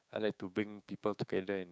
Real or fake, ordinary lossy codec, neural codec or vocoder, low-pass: fake; none; codec, 16 kHz, 6 kbps, DAC; none